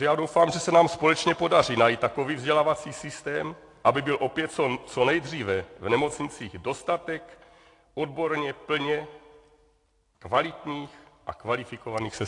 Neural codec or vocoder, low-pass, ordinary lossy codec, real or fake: none; 10.8 kHz; AAC, 48 kbps; real